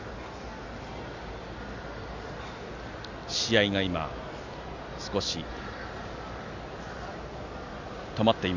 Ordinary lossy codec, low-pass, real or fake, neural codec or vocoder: none; 7.2 kHz; real; none